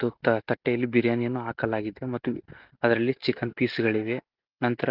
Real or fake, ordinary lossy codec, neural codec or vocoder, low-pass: real; Opus, 32 kbps; none; 5.4 kHz